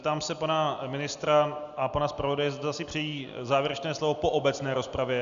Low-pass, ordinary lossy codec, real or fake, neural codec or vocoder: 7.2 kHz; AAC, 96 kbps; real; none